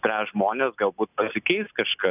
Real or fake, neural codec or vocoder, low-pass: real; none; 3.6 kHz